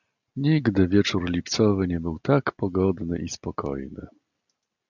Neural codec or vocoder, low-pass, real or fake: none; 7.2 kHz; real